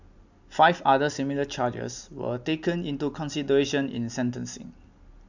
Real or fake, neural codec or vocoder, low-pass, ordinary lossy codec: real; none; 7.2 kHz; none